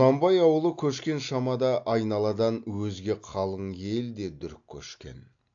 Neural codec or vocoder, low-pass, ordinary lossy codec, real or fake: none; 7.2 kHz; AAC, 64 kbps; real